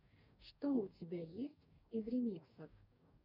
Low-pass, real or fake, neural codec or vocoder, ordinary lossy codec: 5.4 kHz; fake; codec, 44.1 kHz, 2.6 kbps, DAC; MP3, 48 kbps